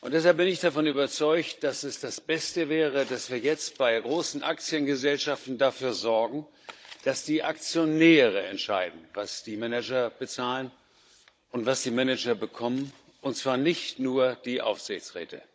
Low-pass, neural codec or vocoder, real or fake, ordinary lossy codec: none; codec, 16 kHz, 16 kbps, FunCodec, trained on Chinese and English, 50 frames a second; fake; none